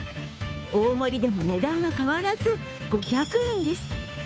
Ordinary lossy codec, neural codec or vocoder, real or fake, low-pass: none; codec, 16 kHz, 2 kbps, FunCodec, trained on Chinese and English, 25 frames a second; fake; none